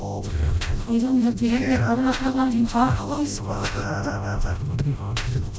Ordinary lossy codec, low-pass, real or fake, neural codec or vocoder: none; none; fake; codec, 16 kHz, 0.5 kbps, FreqCodec, smaller model